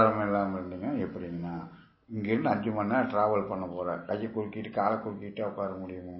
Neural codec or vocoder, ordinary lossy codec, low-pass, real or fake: none; MP3, 24 kbps; 7.2 kHz; real